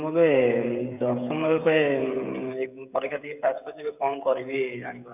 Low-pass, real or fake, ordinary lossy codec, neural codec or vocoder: 3.6 kHz; fake; none; codec, 16 kHz, 16 kbps, FreqCodec, smaller model